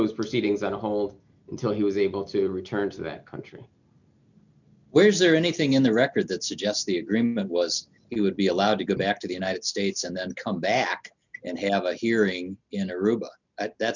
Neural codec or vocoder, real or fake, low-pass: none; real; 7.2 kHz